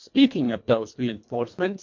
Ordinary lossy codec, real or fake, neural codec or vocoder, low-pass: MP3, 48 kbps; fake; codec, 24 kHz, 1.5 kbps, HILCodec; 7.2 kHz